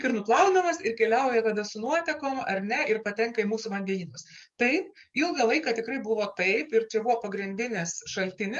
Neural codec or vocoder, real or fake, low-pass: codec, 44.1 kHz, 7.8 kbps, DAC; fake; 10.8 kHz